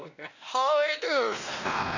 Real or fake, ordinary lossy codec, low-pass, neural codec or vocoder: fake; none; 7.2 kHz; codec, 16 kHz, 1 kbps, X-Codec, WavLM features, trained on Multilingual LibriSpeech